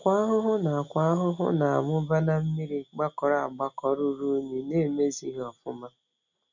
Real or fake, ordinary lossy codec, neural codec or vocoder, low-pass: real; none; none; 7.2 kHz